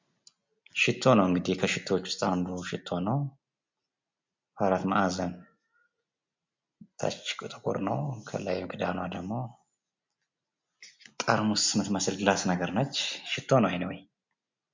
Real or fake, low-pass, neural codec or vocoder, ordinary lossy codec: real; 7.2 kHz; none; MP3, 64 kbps